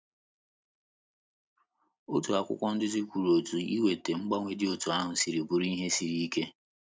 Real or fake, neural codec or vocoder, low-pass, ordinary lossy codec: real; none; none; none